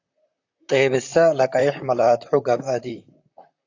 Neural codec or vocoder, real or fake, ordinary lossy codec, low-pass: vocoder, 44.1 kHz, 128 mel bands, Pupu-Vocoder; fake; AAC, 48 kbps; 7.2 kHz